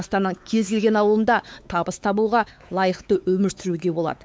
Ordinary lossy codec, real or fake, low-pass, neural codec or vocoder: none; fake; none; codec, 16 kHz, 4 kbps, X-Codec, WavLM features, trained on Multilingual LibriSpeech